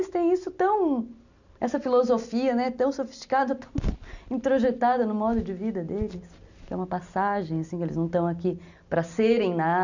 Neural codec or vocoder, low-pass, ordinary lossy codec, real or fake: none; 7.2 kHz; none; real